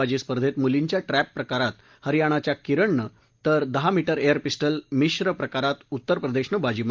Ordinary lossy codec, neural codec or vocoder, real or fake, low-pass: Opus, 32 kbps; none; real; 7.2 kHz